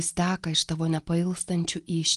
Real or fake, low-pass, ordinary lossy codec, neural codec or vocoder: real; 10.8 kHz; Opus, 24 kbps; none